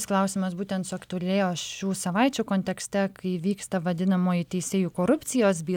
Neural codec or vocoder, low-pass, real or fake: none; 19.8 kHz; real